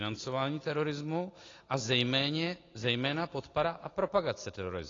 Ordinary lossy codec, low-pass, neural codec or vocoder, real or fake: AAC, 32 kbps; 7.2 kHz; none; real